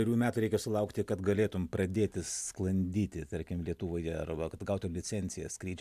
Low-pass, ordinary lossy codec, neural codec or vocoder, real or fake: 14.4 kHz; AAC, 96 kbps; none; real